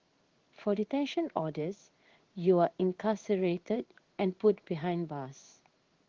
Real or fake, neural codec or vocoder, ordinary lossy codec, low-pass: fake; codec, 16 kHz in and 24 kHz out, 1 kbps, XY-Tokenizer; Opus, 16 kbps; 7.2 kHz